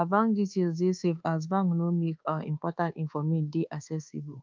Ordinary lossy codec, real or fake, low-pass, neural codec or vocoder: Opus, 24 kbps; fake; 7.2 kHz; codec, 24 kHz, 1.2 kbps, DualCodec